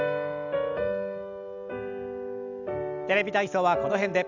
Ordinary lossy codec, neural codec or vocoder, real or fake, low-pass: none; none; real; 7.2 kHz